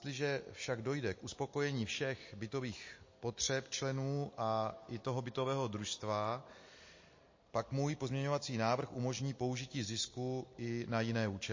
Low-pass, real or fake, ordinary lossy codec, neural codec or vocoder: 7.2 kHz; real; MP3, 32 kbps; none